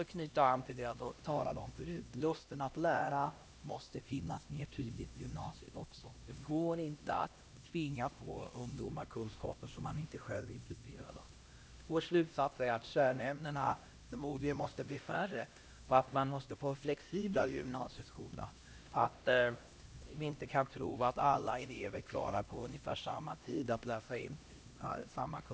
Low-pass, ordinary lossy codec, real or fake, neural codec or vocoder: none; none; fake; codec, 16 kHz, 1 kbps, X-Codec, HuBERT features, trained on LibriSpeech